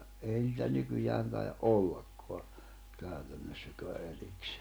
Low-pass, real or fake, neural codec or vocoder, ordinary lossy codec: none; real; none; none